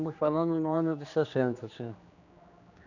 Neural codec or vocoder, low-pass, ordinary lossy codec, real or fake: codec, 16 kHz, 2 kbps, X-Codec, HuBERT features, trained on general audio; 7.2 kHz; none; fake